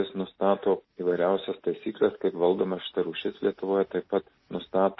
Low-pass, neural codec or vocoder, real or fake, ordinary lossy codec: 7.2 kHz; none; real; MP3, 24 kbps